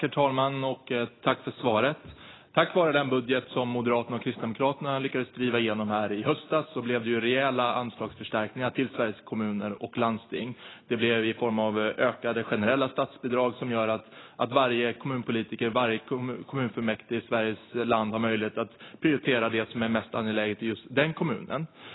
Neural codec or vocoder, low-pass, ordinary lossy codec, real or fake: none; 7.2 kHz; AAC, 16 kbps; real